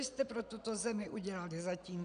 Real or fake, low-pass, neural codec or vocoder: real; 9.9 kHz; none